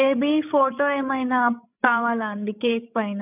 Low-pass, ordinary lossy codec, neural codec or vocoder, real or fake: 3.6 kHz; none; codec, 16 kHz, 16 kbps, FreqCodec, larger model; fake